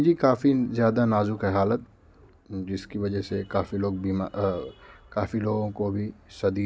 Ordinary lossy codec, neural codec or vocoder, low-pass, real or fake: none; none; none; real